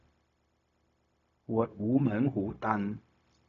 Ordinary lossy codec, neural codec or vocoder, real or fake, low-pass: none; codec, 16 kHz, 0.4 kbps, LongCat-Audio-Codec; fake; 7.2 kHz